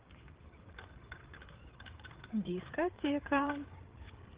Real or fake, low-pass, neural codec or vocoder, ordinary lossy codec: fake; 3.6 kHz; codec, 16 kHz, 8 kbps, FreqCodec, larger model; Opus, 16 kbps